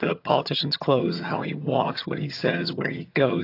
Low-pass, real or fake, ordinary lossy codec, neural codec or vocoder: 5.4 kHz; fake; AAC, 32 kbps; vocoder, 22.05 kHz, 80 mel bands, HiFi-GAN